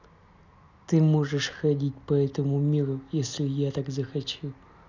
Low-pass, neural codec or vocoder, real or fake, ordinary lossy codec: 7.2 kHz; none; real; none